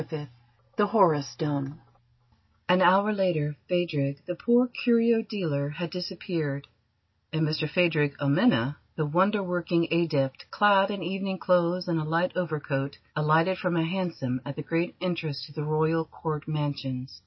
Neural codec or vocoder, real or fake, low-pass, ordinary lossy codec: none; real; 7.2 kHz; MP3, 24 kbps